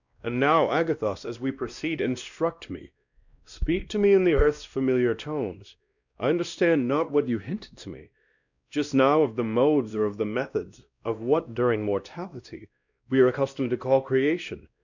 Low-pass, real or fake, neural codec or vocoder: 7.2 kHz; fake; codec, 16 kHz, 1 kbps, X-Codec, WavLM features, trained on Multilingual LibriSpeech